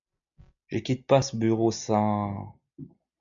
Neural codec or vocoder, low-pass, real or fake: none; 7.2 kHz; real